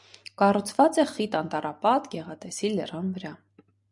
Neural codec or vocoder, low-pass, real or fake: none; 10.8 kHz; real